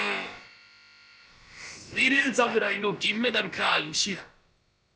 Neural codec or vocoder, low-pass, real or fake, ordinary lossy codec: codec, 16 kHz, about 1 kbps, DyCAST, with the encoder's durations; none; fake; none